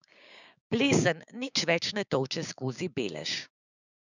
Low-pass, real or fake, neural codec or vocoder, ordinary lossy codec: 7.2 kHz; fake; codec, 16 kHz, 6 kbps, DAC; none